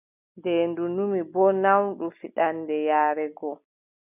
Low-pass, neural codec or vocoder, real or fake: 3.6 kHz; none; real